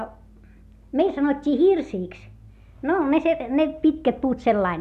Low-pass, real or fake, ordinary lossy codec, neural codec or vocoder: 14.4 kHz; real; none; none